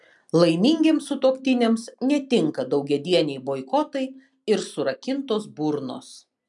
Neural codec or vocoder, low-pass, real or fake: none; 10.8 kHz; real